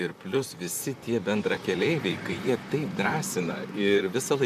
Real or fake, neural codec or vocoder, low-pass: fake; vocoder, 44.1 kHz, 128 mel bands, Pupu-Vocoder; 14.4 kHz